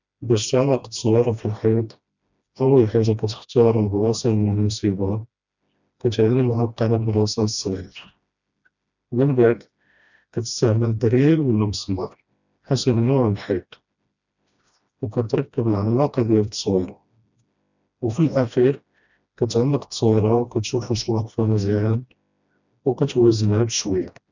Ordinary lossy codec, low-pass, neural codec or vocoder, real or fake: none; 7.2 kHz; codec, 16 kHz, 1 kbps, FreqCodec, smaller model; fake